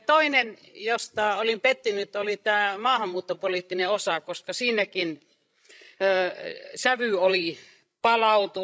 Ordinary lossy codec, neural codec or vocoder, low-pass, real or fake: none; codec, 16 kHz, 16 kbps, FreqCodec, larger model; none; fake